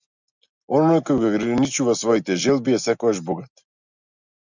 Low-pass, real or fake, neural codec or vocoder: 7.2 kHz; real; none